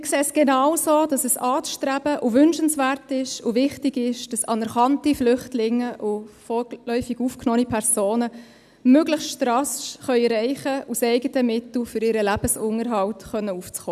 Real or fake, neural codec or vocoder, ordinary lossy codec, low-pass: real; none; none; 14.4 kHz